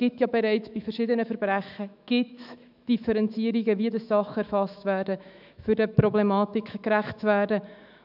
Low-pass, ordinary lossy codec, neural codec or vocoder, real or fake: 5.4 kHz; none; autoencoder, 48 kHz, 128 numbers a frame, DAC-VAE, trained on Japanese speech; fake